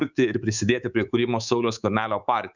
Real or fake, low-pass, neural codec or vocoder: fake; 7.2 kHz; codec, 24 kHz, 3.1 kbps, DualCodec